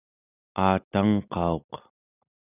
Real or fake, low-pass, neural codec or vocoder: real; 3.6 kHz; none